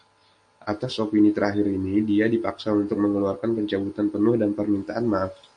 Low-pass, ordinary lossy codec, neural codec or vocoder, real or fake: 9.9 kHz; MP3, 48 kbps; none; real